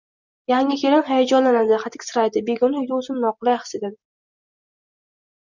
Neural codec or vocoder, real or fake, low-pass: none; real; 7.2 kHz